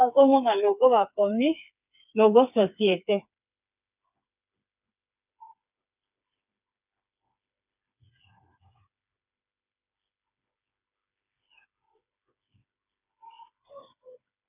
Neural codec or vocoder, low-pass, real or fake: codec, 16 kHz, 4 kbps, FreqCodec, smaller model; 3.6 kHz; fake